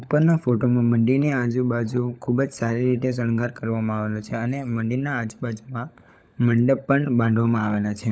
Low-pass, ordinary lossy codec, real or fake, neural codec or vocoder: none; none; fake; codec, 16 kHz, 16 kbps, FunCodec, trained on LibriTTS, 50 frames a second